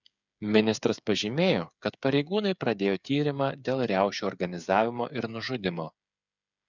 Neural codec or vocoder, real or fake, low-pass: codec, 16 kHz, 8 kbps, FreqCodec, smaller model; fake; 7.2 kHz